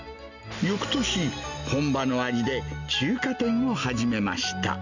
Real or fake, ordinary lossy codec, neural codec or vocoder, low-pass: real; none; none; 7.2 kHz